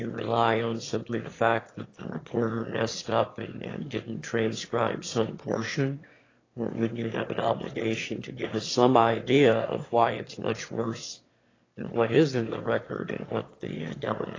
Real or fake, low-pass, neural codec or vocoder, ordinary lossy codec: fake; 7.2 kHz; autoencoder, 22.05 kHz, a latent of 192 numbers a frame, VITS, trained on one speaker; AAC, 32 kbps